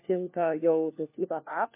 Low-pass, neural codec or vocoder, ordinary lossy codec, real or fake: 3.6 kHz; codec, 16 kHz, 1 kbps, FunCodec, trained on LibriTTS, 50 frames a second; MP3, 24 kbps; fake